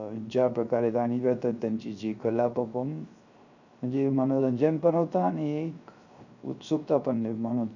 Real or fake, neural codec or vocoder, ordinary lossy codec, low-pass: fake; codec, 16 kHz, 0.3 kbps, FocalCodec; none; 7.2 kHz